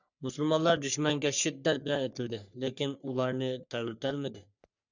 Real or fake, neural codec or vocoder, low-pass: fake; codec, 44.1 kHz, 3.4 kbps, Pupu-Codec; 7.2 kHz